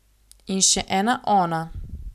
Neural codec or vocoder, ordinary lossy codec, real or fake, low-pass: none; none; real; 14.4 kHz